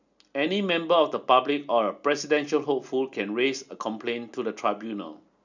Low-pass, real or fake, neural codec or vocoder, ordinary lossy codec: 7.2 kHz; real; none; none